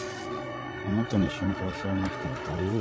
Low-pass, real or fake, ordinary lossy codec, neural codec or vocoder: none; fake; none; codec, 16 kHz, 16 kbps, FreqCodec, larger model